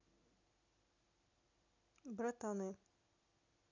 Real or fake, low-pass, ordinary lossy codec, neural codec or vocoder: real; 7.2 kHz; none; none